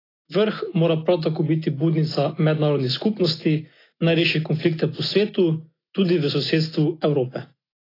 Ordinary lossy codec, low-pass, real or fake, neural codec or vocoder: AAC, 24 kbps; 5.4 kHz; real; none